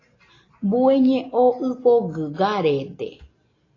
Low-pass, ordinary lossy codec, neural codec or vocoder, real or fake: 7.2 kHz; AAC, 32 kbps; none; real